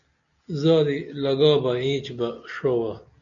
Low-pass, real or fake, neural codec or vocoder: 7.2 kHz; real; none